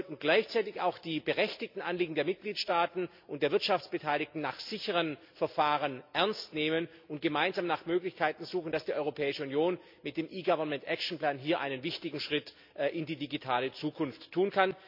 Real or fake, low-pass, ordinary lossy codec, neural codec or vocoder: real; 5.4 kHz; MP3, 48 kbps; none